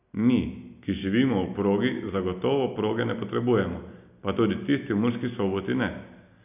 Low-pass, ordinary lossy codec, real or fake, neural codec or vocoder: 3.6 kHz; none; real; none